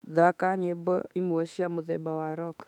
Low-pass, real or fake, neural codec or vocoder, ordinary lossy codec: 19.8 kHz; fake; autoencoder, 48 kHz, 32 numbers a frame, DAC-VAE, trained on Japanese speech; none